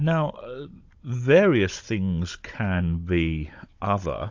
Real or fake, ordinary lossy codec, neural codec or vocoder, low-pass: fake; AAC, 48 kbps; codec, 16 kHz, 16 kbps, FunCodec, trained on Chinese and English, 50 frames a second; 7.2 kHz